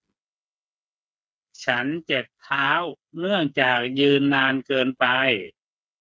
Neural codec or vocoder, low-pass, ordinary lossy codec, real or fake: codec, 16 kHz, 4 kbps, FreqCodec, smaller model; none; none; fake